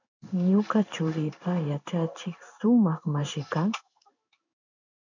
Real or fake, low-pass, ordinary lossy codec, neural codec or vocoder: fake; 7.2 kHz; MP3, 64 kbps; codec, 16 kHz in and 24 kHz out, 1 kbps, XY-Tokenizer